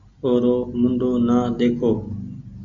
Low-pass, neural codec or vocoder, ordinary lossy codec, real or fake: 7.2 kHz; none; MP3, 64 kbps; real